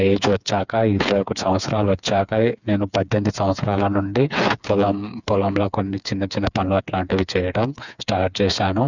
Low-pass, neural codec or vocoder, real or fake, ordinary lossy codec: 7.2 kHz; codec, 16 kHz, 4 kbps, FreqCodec, smaller model; fake; none